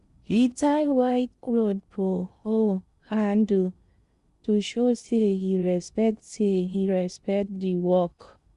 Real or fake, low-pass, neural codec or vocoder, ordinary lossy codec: fake; 10.8 kHz; codec, 16 kHz in and 24 kHz out, 0.6 kbps, FocalCodec, streaming, 4096 codes; Opus, 64 kbps